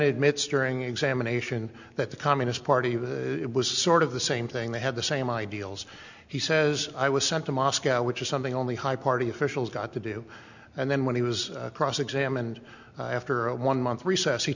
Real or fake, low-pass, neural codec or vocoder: real; 7.2 kHz; none